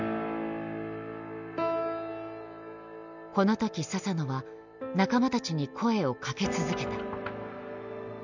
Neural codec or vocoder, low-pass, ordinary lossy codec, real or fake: none; 7.2 kHz; none; real